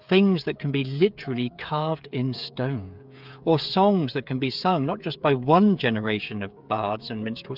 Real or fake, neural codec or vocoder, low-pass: fake; codec, 16 kHz, 8 kbps, FreqCodec, smaller model; 5.4 kHz